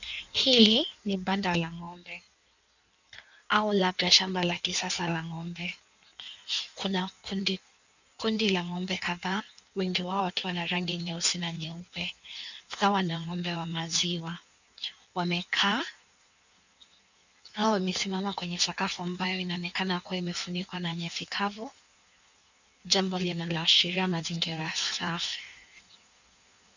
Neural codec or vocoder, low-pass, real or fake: codec, 16 kHz in and 24 kHz out, 1.1 kbps, FireRedTTS-2 codec; 7.2 kHz; fake